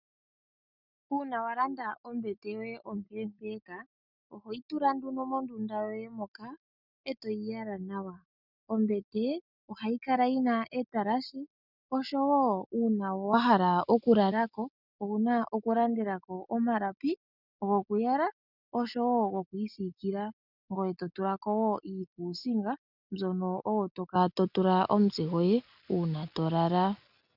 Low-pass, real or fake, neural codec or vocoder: 5.4 kHz; real; none